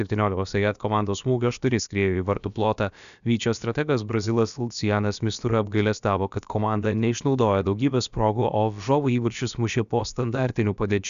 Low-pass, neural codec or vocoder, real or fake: 7.2 kHz; codec, 16 kHz, about 1 kbps, DyCAST, with the encoder's durations; fake